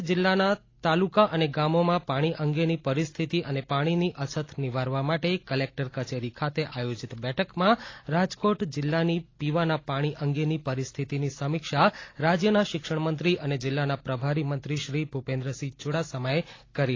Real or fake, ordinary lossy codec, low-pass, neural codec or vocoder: real; AAC, 32 kbps; 7.2 kHz; none